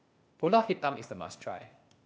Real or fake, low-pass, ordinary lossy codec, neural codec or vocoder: fake; none; none; codec, 16 kHz, 0.8 kbps, ZipCodec